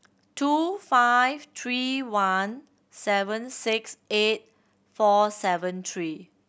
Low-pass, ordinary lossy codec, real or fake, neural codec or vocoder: none; none; real; none